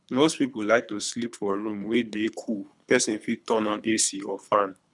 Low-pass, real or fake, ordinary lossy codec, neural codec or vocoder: 10.8 kHz; fake; none; codec, 24 kHz, 3 kbps, HILCodec